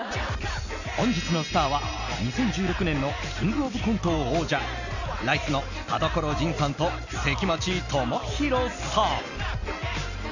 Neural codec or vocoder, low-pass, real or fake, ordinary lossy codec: none; 7.2 kHz; real; none